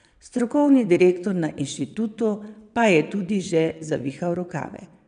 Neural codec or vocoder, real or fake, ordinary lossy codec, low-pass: vocoder, 22.05 kHz, 80 mel bands, WaveNeXt; fake; none; 9.9 kHz